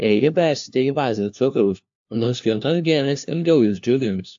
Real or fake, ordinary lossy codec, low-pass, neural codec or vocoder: fake; AAC, 64 kbps; 7.2 kHz; codec, 16 kHz, 1 kbps, FunCodec, trained on LibriTTS, 50 frames a second